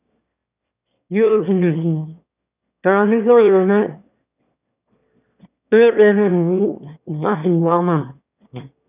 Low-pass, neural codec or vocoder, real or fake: 3.6 kHz; autoencoder, 22.05 kHz, a latent of 192 numbers a frame, VITS, trained on one speaker; fake